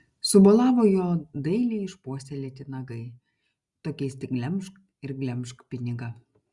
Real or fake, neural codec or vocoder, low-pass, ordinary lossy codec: real; none; 10.8 kHz; Opus, 64 kbps